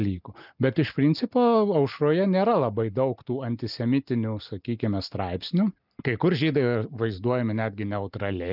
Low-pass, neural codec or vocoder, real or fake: 5.4 kHz; none; real